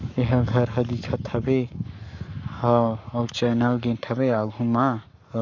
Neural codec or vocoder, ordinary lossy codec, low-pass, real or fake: codec, 44.1 kHz, 7.8 kbps, Pupu-Codec; none; 7.2 kHz; fake